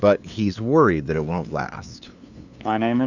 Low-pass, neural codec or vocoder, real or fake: 7.2 kHz; codec, 16 kHz, 2 kbps, FunCodec, trained on LibriTTS, 25 frames a second; fake